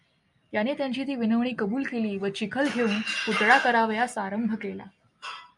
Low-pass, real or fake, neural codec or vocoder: 10.8 kHz; real; none